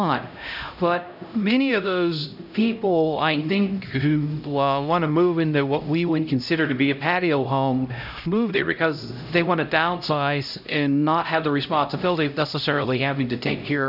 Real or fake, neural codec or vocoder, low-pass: fake; codec, 16 kHz, 0.5 kbps, X-Codec, HuBERT features, trained on LibriSpeech; 5.4 kHz